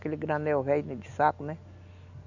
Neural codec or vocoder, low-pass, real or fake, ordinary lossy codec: none; 7.2 kHz; real; none